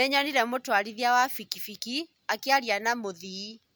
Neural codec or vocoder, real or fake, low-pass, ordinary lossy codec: none; real; none; none